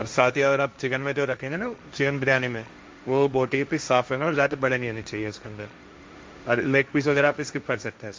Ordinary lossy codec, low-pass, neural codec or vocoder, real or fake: none; none; codec, 16 kHz, 1.1 kbps, Voila-Tokenizer; fake